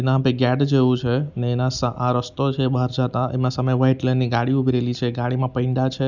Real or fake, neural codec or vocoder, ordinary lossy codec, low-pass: real; none; none; 7.2 kHz